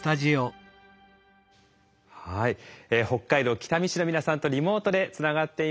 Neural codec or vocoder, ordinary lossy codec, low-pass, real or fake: none; none; none; real